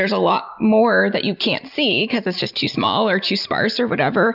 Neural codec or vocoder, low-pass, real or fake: none; 5.4 kHz; real